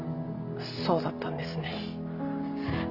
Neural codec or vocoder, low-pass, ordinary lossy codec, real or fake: none; 5.4 kHz; Opus, 64 kbps; real